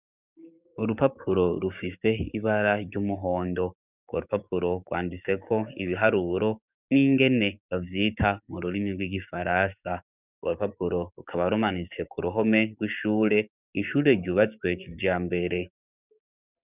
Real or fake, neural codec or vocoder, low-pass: fake; codec, 16 kHz, 6 kbps, DAC; 3.6 kHz